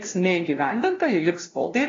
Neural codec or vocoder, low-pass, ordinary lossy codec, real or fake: codec, 16 kHz, 0.5 kbps, FunCodec, trained on LibriTTS, 25 frames a second; 7.2 kHz; AAC, 32 kbps; fake